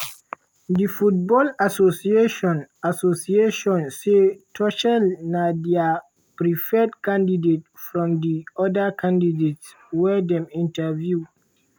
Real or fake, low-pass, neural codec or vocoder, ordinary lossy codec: real; none; none; none